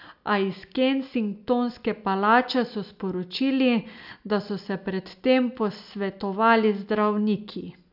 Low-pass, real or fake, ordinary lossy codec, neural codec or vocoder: 5.4 kHz; real; none; none